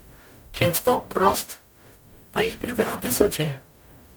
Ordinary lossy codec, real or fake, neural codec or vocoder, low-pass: none; fake; codec, 44.1 kHz, 0.9 kbps, DAC; none